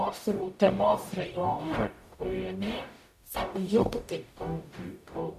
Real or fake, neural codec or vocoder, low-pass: fake; codec, 44.1 kHz, 0.9 kbps, DAC; 14.4 kHz